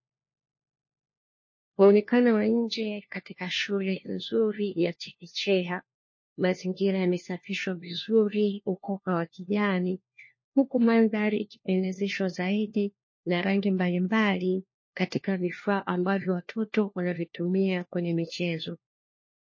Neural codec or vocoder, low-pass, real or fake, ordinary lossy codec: codec, 16 kHz, 1 kbps, FunCodec, trained on LibriTTS, 50 frames a second; 7.2 kHz; fake; MP3, 32 kbps